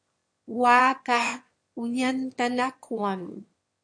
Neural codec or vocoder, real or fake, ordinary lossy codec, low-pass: autoencoder, 22.05 kHz, a latent of 192 numbers a frame, VITS, trained on one speaker; fake; MP3, 48 kbps; 9.9 kHz